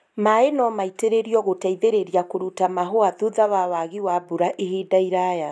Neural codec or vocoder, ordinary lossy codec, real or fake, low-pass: none; none; real; none